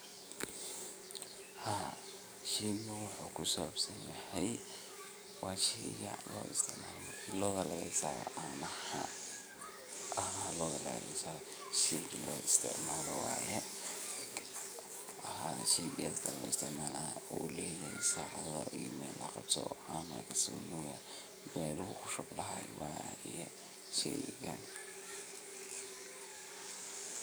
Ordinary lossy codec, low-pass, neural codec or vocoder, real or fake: none; none; codec, 44.1 kHz, 7.8 kbps, DAC; fake